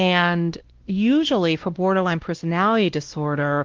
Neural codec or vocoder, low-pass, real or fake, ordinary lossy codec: codec, 16 kHz, 1 kbps, X-Codec, WavLM features, trained on Multilingual LibriSpeech; 7.2 kHz; fake; Opus, 16 kbps